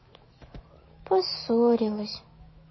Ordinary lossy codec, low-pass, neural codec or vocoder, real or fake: MP3, 24 kbps; 7.2 kHz; autoencoder, 48 kHz, 128 numbers a frame, DAC-VAE, trained on Japanese speech; fake